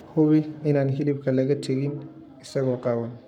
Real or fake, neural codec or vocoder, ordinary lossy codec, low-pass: fake; codec, 44.1 kHz, 7.8 kbps, Pupu-Codec; none; 19.8 kHz